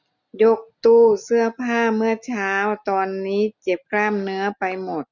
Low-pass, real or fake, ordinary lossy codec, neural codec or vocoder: 7.2 kHz; real; none; none